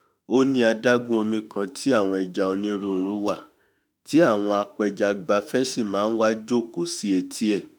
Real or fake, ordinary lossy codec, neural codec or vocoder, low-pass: fake; none; autoencoder, 48 kHz, 32 numbers a frame, DAC-VAE, trained on Japanese speech; none